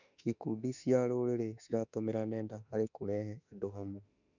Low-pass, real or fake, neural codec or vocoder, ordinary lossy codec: 7.2 kHz; fake; autoencoder, 48 kHz, 32 numbers a frame, DAC-VAE, trained on Japanese speech; none